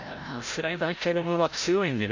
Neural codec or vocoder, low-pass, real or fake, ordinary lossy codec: codec, 16 kHz, 0.5 kbps, FreqCodec, larger model; 7.2 kHz; fake; MP3, 48 kbps